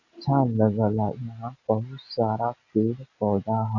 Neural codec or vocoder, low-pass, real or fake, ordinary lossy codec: none; 7.2 kHz; real; AAC, 48 kbps